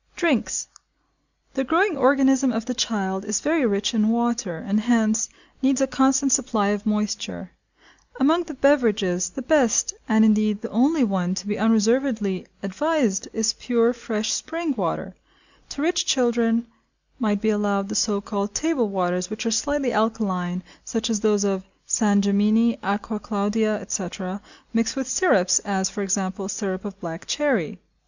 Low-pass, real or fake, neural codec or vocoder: 7.2 kHz; real; none